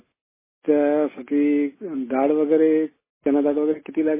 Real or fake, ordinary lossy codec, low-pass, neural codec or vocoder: real; MP3, 16 kbps; 3.6 kHz; none